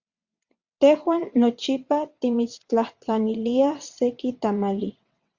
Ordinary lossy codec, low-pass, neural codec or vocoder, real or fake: Opus, 64 kbps; 7.2 kHz; vocoder, 22.05 kHz, 80 mel bands, WaveNeXt; fake